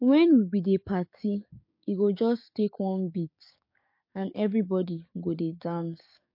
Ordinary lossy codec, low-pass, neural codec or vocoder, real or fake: MP3, 32 kbps; 5.4 kHz; codec, 16 kHz, 6 kbps, DAC; fake